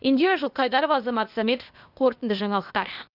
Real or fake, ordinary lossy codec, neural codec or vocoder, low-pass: fake; none; codec, 16 kHz, 0.8 kbps, ZipCodec; 5.4 kHz